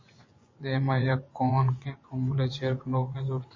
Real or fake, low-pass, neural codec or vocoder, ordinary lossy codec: fake; 7.2 kHz; vocoder, 24 kHz, 100 mel bands, Vocos; MP3, 32 kbps